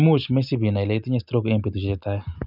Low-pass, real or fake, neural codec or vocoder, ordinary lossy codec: 5.4 kHz; real; none; none